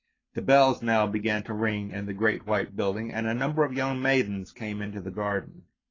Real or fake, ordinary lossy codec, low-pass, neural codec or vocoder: fake; AAC, 32 kbps; 7.2 kHz; codec, 44.1 kHz, 7.8 kbps, Pupu-Codec